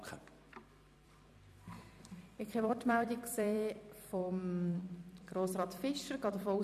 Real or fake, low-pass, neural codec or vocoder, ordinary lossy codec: fake; 14.4 kHz; vocoder, 44.1 kHz, 128 mel bands every 256 samples, BigVGAN v2; MP3, 96 kbps